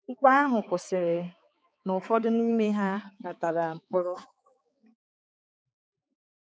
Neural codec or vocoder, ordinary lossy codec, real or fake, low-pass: codec, 16 kHz, 4 kbps, X-Codec, HuBERT features, trained on balanced general audio; none; fake; none